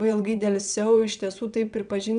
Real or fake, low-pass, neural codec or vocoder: fake; 9.9 kHz; vocoder, 22.05 kHz, 80 mel bands, WaveNeXt